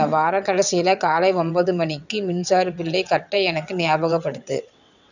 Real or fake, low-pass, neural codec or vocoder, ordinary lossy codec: fake; 7.2 kHz; vocoder, 22.05 kHz, 80 mel bands, WaveNeXt; none